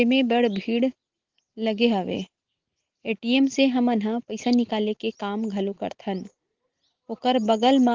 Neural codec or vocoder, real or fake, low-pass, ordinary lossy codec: none; real; 7.2 kHz; Opus, 16 kbps